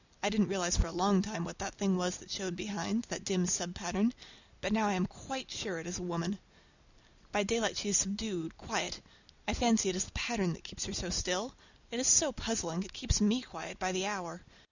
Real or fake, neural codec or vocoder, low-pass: real; none; 7.2 kHz